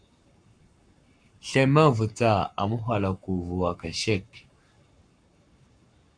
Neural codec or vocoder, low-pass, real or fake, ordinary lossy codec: codec, 44.1 kHz, 7.8 kbps, Pupu-Codec; 9.9 kHz; fake; MP3, 96 kbps